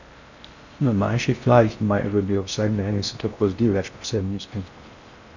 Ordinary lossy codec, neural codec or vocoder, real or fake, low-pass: none; codec, 16 kHz in and 24 kHz out, 0.6 kbps, FocalCodec, streaming, 4096 codes; fake; 7.2 kHz